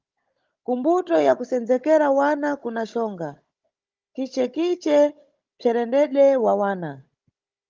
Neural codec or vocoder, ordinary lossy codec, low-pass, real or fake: codec, 16 kHz, 16 kbps, FunCodec, trained on Chinese and English, 50 frames a second; Opus, 24 kbps; 7.2 kHz; fake